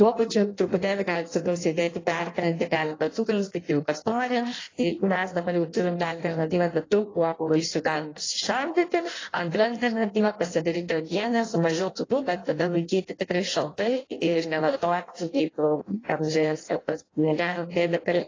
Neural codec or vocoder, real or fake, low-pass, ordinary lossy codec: codec, 16 kHz in and 24 kHz out, 0.6 kbps, FireRedTTS-2 codec; fake; 7.2 kHz; AAC, 32 kbps